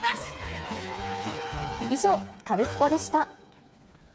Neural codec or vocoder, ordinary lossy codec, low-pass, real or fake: codec, 16 kHz, 4 kbps, FreqCodec, smaller model; none; none; fake